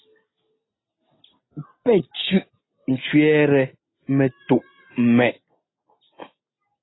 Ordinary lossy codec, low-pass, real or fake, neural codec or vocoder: AAC, 16 kbps; 7.2 kHz; real; none